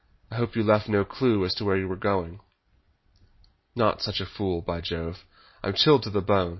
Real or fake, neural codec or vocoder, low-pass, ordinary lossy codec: real; none; 7.2 kHz; MP3, 24 kbps